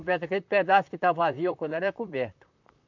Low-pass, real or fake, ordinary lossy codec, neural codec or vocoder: 7.2 kHz; fake; none; vocoder, 44.1 kHz, 128 mel bands, Pupu-Vocoder